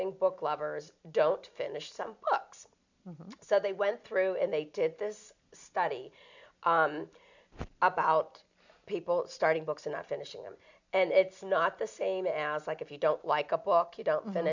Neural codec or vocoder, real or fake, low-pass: none; real; 7.2 kHz